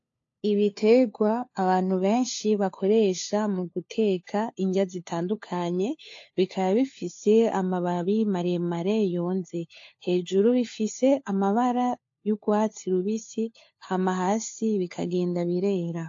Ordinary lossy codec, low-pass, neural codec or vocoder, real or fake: AAC, 48 kbps; 7.2 kHz; codec, 16 kHz, 4 kbps, FunCodec, trained on LibriTTS, 50 frames a second; fake